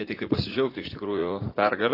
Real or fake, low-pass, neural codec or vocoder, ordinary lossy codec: fake; 5.4 kHz; vocoder, 44.1 kHz, 80 mel bands, Vocos; AAC, 24 kbps